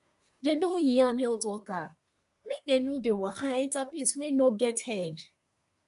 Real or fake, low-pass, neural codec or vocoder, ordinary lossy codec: fake; 10.8 kHz; codec, 24 kHz, 1 kbps, SNAC; none